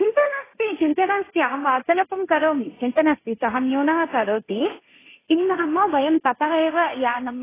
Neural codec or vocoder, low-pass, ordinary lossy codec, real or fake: codec, 16 kHz, 1.1 kbps, Voila-Tokenizer; 3.6 kHz; AAC, 16 kbps; fake